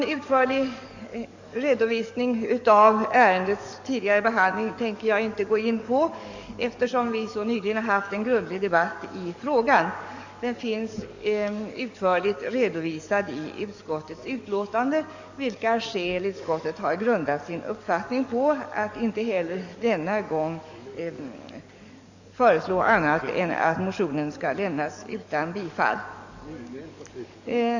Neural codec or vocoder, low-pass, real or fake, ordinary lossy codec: codec, 44.1 kHz, 7.8 kbps, DAC; 7.2 kHz; fake; Opus, 64 kbps